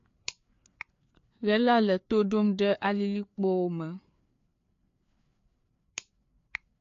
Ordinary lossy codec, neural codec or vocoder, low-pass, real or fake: AAC, 48 kbps; codec, 16 kHz, 4 kbps, FreqCodec, larger model; 7.2 kHz; fake